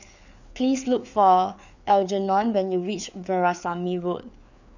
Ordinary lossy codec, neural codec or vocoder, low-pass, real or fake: none; codec, 16 kHz, 4 kbps, FreqCodec, larger model; 7.2 kHz; fake